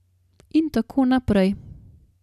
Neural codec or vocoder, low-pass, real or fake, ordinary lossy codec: none; 14.4 kHz; real; none